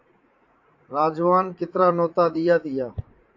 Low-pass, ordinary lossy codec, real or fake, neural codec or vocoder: 7.2 kHz; AAC, 48 kbps; fake; vocoder, 44.1 kHz, 80 mel bands, Vocos